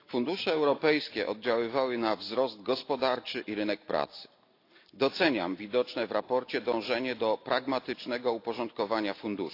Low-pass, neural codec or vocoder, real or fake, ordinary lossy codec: 5.4 kHz; none; real; AAC, 32 kbps